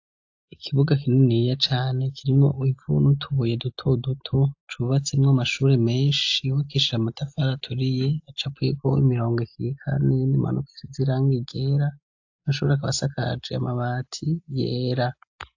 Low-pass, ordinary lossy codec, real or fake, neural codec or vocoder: 7.2 kHz; AAC, 48 kbps; real; none